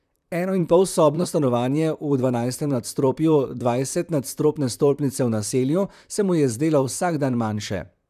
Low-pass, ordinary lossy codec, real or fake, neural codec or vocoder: 14.4 kHz; none; fake; vocoder, 44.1 kHz, 128 mel bands, Pupu-Vocoder